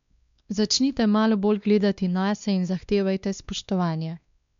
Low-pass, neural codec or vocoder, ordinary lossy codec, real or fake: 7.2 kHz; codec, 16 kHz, 2 kbps, X-Codec, WavLM features, trained on Multilingual LibriSpeech; MP3, 64 kbps; fake